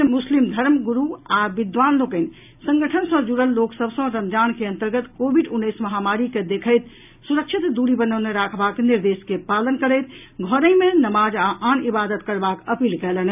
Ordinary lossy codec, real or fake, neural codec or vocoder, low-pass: none; real; none; 3.6 kHz